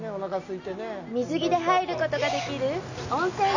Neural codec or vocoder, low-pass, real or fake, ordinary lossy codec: none; 7.2 kHz; real; none